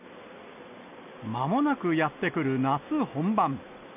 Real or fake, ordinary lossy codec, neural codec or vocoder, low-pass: real; none; none; 3.6 kHz